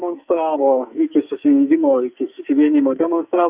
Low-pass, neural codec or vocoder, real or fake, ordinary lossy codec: 3.6 kHz; codec, 44.1 kHz, 2.6 kbps, SNAC; fake; Opus, 64 kbps